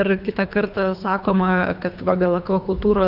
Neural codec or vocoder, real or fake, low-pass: codec, 24 kHz, 3 kbps, HILCodec; fake; 5.4 kHz